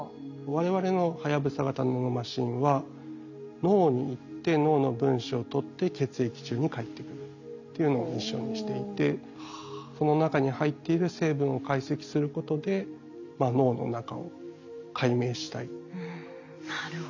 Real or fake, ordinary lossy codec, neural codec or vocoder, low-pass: real; none; none; 7.2 kHz